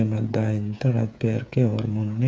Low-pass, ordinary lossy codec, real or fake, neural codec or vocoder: none; none; fake; codec, 16 kHz, 8 kbps, FreqCodec, smaller model